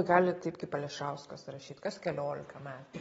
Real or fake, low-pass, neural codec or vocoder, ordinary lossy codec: real; 19.8 kHz; none; AAC, 24 kbps